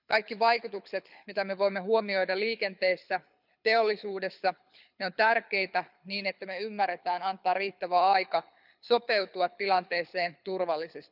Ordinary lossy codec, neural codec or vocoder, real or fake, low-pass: none; codec, 24 kHz, 6 kbps, HILCodec; fake; 5.4 kHz